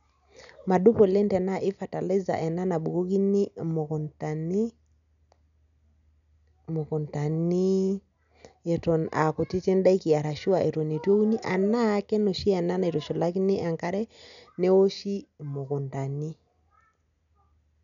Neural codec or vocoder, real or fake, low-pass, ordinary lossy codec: none; real; 7.2 kHz; none